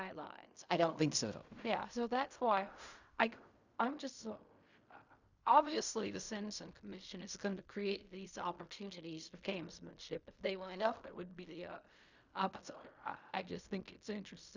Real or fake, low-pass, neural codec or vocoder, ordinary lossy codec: fake; 7.2 kHz; codec, 16 kHz in and 24 kHz out, 0.4 kbps, LongCat-Audio-Codec, fine tuned four codebook decoder; Opus, 64 kbps